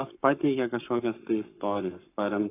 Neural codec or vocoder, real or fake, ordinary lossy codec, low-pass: codec, 44.1 kHz, 7.8 kbps, Pupu-Codec; fake; AAC, 24 kbps; 3.6 kHz